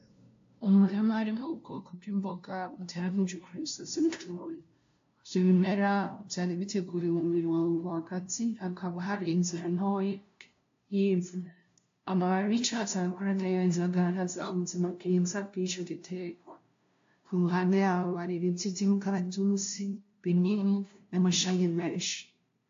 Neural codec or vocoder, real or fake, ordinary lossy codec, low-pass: codec, 16 kHz, 0.5 kbps, FunCodec, trained on LibriTTS, 25 frames a second; fake; AAC, 48 kbps; 7.2 kHz